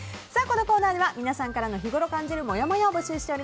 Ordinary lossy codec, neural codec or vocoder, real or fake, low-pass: none; none; real; none